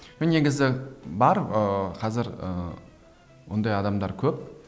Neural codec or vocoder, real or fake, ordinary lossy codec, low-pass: none; real; none; none